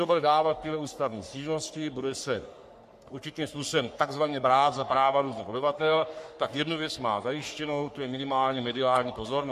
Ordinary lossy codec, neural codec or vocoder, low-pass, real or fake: MP3, 64 kbps; codec, 44.1 kHz, 3.4 kbps, Pupu-Codec; 14.4 kHz; fake